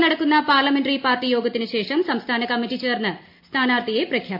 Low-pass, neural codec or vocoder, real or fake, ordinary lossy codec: 5.4 kHz; none; real; MP3, 32 kbps